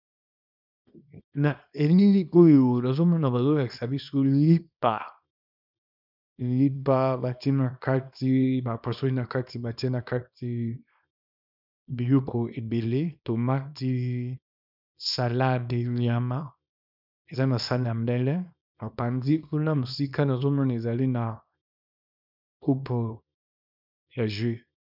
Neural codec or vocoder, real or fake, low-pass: codec, 24 kHz, 0.9 kbps, WavTokenizer, small release; fake; 5.4 kHz